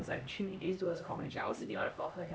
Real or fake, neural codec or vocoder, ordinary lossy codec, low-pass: fake; codec, 16 kHz, 1 kbps, X-Codec, HuBERT features, trained on LibriSpeech; none; none